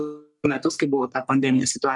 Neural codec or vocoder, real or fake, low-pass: codec, 44.1 kHz, 3.4 kbps, Pupu-Codec; fake; 10.8 kHz